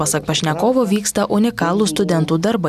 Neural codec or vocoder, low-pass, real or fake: none; 14.4 kHz; real